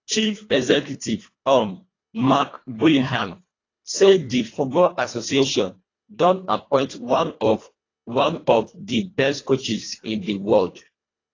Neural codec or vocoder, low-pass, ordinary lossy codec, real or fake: codec, 24 kHz, 1.5 kbps, HILCodec; 7.2 kHz; AAC, 32 kbps; fake